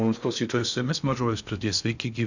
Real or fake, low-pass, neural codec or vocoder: fake; 7.2 kHz; codec, 16 kHz in and 24 kHz out, 0.6 kbps, FocalCodec, streaming, 2048 codes